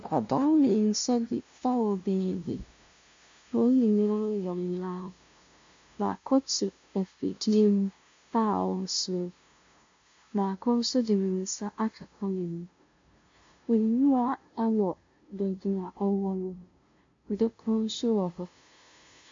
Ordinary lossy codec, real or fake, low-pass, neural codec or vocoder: MP3, 48 kbps; fake; 7.2 kHz; codec, 16 kHz, 0.5 kbps, FunCodec, trained on LibriTTS, 25 frames a second